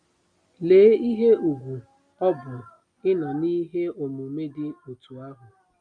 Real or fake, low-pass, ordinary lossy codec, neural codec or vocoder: real; 9.9 kHz; none; none